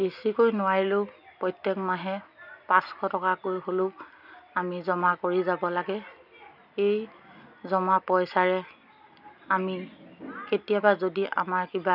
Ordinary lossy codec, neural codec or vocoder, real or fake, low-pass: none; none; real; 5.4 kHz